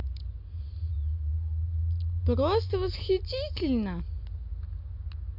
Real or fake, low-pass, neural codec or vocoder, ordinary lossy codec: real; 5.4 kHz; none; MP3, 48 kbps